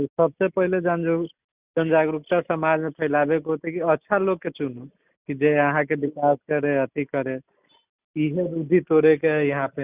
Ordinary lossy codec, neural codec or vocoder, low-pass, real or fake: Opus, 64 kbps; none; 3.6 kHz; real